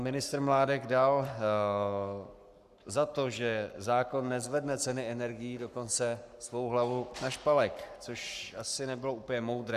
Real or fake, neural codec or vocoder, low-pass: fake; codec, 44.1 kHz, 7.8 kbps, Pupu-Codec; 14.4 kHz